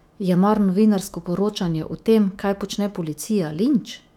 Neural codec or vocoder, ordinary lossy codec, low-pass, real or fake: autoencoder, 48 kHz, 128 numbers a frame, DAC-VAE, trained on Japanese speech; none; 19.8 kHz; fake